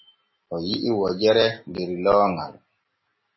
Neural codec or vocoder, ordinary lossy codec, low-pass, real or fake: none; MP3, 24 kbps; 7.2 kHz; real